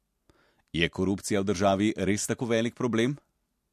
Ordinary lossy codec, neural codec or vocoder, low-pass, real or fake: MP3, 64 kbps; none; 14.4 kHz; real